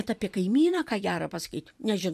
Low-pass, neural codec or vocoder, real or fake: 14.4 kHz; vocoder, 44.1 kHz, 128 mel bands every 256 samples, BigVGAN v2; fake